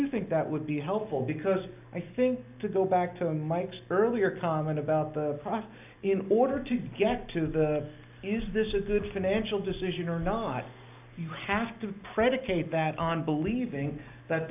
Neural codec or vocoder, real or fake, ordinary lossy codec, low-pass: none; real; AAC, 32 kbps; 3.6 kHz